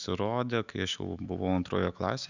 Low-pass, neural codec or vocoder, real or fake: 7.2 kHz; autoencoder, 48 kHz, 128 numbers a frame, DAC-VAE, trained on Japanese speech; fake